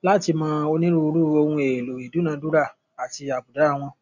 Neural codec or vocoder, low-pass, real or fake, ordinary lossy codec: none; 7.2 kHz; real; none